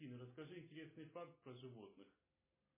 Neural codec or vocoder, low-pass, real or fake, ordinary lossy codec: none; 3.6 kHz; real; MP3, 16 kbps